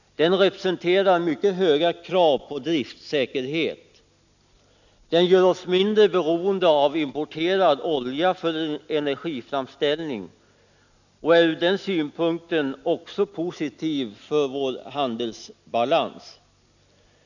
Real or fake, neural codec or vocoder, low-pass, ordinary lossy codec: real; none; 7.2 kHz; none